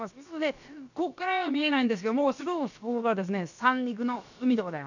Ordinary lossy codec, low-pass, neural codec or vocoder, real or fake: none; 7.2 kHz; codec, 16 kHz, about 1 kbps, DyCAST, with the encoder's durations; fake